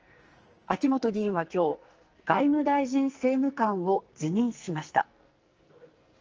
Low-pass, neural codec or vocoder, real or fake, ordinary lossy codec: 7.2 kHz; codec, 44.1 kHz, 2.6 kbps, SNAC; fake; Opus, 24 kbps